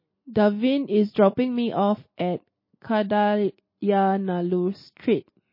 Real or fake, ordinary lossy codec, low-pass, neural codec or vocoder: real; MP3, 24 kbps; 5.4 kHz; none